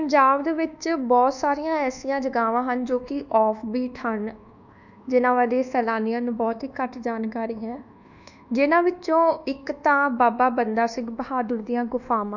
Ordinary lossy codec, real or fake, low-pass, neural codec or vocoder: none; fake; 7.2 kHz; codec, 24 kHz, 1.2 kbps, DualCodec